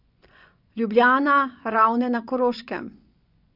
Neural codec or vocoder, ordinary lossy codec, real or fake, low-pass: vocoder, 24 kHz, 100 mel bands, Vocos; none; fake; 5.4 kHz